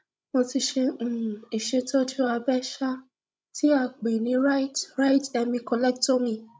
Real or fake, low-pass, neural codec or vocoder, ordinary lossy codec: fake; none; codec, 16 kHz, 16 kbps, FreqCodec, larger model; none